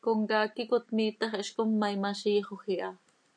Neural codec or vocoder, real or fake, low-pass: none; real; 9.9 kHz